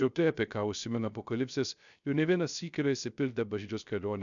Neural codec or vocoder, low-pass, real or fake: codec, 16 kHz, 0.3 kbps, FocalCodec; 7.2 kHz; fake